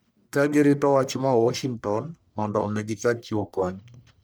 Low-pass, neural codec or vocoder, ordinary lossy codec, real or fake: none; codec, 44.1 kHz, 1.7 kbps, Pupu-Codec; none; fake